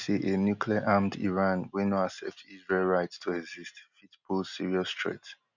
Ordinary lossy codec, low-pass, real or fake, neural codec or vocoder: none; 7.2 kHz; real; none